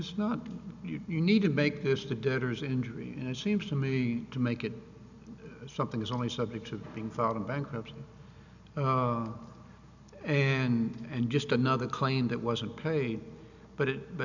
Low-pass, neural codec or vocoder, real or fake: 7.2 kHz; none; real